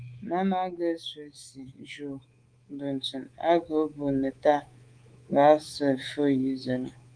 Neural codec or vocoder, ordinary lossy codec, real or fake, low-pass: codec, 24 kHz, 3.1 kbps, DualCodec; Opus, 24 kbps; fake; 9.9 kHz